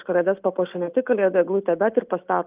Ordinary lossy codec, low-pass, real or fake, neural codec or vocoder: Opus, 32 kbps; 3.6 kHz; real; none